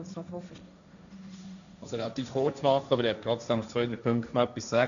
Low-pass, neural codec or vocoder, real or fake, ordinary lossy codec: 7.2 kHz; codec, 16 kHz, 1.1 kbps, Voila-Tokenizer; fake; none